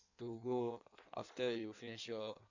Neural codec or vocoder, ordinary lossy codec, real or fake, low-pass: codec, 16 kHz in and 24 kHz out, 1.1 kbps, FireRedTTS-2 codec; none; fake; 7.2 kHz